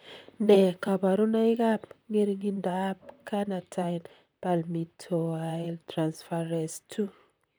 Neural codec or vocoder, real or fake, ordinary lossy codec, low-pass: vocoder, 44.1 kHz, 128 mel bands, Pupu-Vocoder; fake; none; none